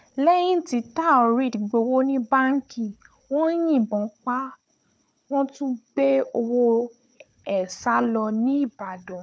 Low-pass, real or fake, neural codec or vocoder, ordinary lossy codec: none; fake; codec, 16 kHz, 4 kbps, FunCodec, trained on Chinese and English, 50 frames a second; none